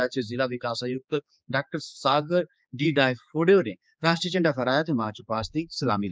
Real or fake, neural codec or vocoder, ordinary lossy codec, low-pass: fake; codec, 16 kHz, 4 kbps, X-Codec, HuBERT features, trained on general audio; none; none